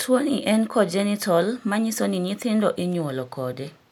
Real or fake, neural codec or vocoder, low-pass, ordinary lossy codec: real; none; 19.8 kHz; none